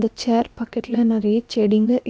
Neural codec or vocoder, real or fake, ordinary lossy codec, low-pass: codec, 16 kHz, about 1 kbps, DyCAST, with the encoder's durations; fake; none; none